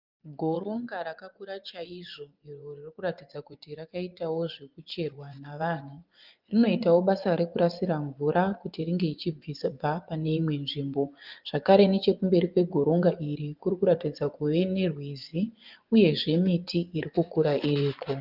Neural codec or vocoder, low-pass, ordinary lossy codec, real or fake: vocoder, 24 kHz, 100 mel bands, Vocos; 5.4 kHz; Opus, 24 kbps; fake